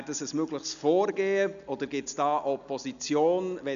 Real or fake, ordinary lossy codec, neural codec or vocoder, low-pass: real; none; none; 7.2 kHz